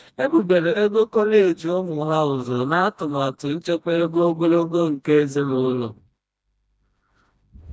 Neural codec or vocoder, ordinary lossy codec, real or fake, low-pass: codec, 16 kHz, 1 kbps, FreqCodec, smaller model; none; fake; none